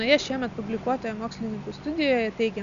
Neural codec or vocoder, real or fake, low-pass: none; real; 7.2 kHz